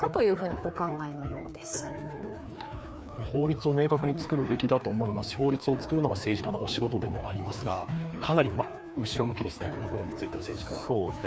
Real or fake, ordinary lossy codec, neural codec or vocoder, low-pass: fake; none; codec, 16 kHz, 2 kbps, FreqCodec, larger model; none